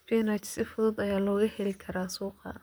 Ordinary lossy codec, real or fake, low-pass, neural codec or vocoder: none; fake; none; vocoder, 44.1 kHz, 128 mel bands, Pupu-Vocoder